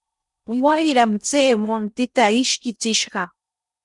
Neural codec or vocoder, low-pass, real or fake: codec, 16 kHz in and 24 kHz out, 0.6 kbps, FocalCodec, streaming, 4096 codes; 10.8 kHz; fake